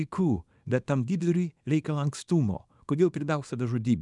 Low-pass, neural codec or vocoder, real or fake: 10.8 kHz; codec, 24 kHz, 0.9 kbps, WavTokenizer, small release; fake